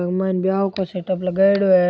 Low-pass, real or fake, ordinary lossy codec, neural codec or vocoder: none; real; none; none